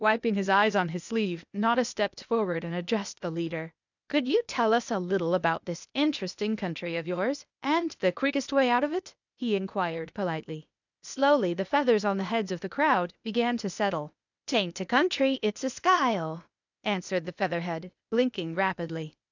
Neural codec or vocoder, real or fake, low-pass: codec, 16 kHz, 0.8 kbps, ZipCodec; fake; 7.2 kHz